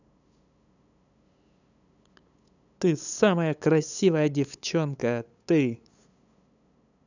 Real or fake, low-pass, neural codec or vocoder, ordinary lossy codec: fake; 7.2 kHz; codec, 16 kHz, 8 kbps, FunCodec, trained on LibriTTS, 25 frames a second; none